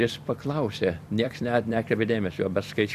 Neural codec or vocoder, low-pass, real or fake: vocoder, 44.1 kHz, 128 mel bands every 256 samples, BigVGAN v2; 14.4 kHz; fake